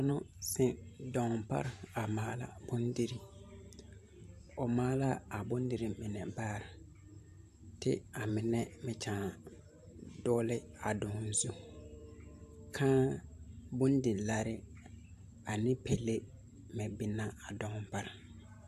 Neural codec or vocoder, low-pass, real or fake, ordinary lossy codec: vocoder, 44.1 kHz, 128 mel bands every 512 samples, BigVGAN v2; 14.4 kHz; fake; AAC, 96 kbps